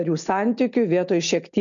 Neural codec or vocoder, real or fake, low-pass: none; real; 7.2 kHz